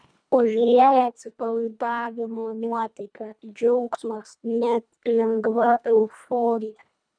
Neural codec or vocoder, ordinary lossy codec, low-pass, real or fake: codec, 24 kHz, 1.5 kbps, HILCodec; MP3, 96 kbps; 9.9 kHz; fake